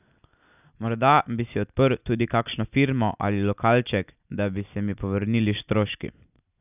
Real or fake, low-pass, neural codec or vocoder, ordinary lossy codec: real; 3.6 kHz; none; none